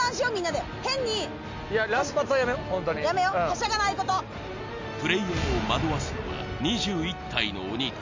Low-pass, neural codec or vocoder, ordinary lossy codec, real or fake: 7.2 kHz; none; MP3, 64 kbps; real